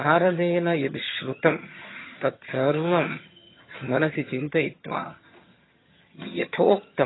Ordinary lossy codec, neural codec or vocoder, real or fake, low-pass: AAC, 16 kbps; vocoder, 22.05 kHz, 80 mel bands, HiFi-GAN; fake; 7.2 kHz